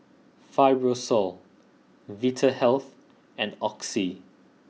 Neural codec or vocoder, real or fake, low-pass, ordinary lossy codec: none; real; none; none